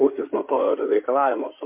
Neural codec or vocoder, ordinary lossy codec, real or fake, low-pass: codec, 16 kHz in and 24 kHz out, 2.2 kbps, FireRedTTS-2 codec; MP3, 32 kbps; fake; 3.6 kHz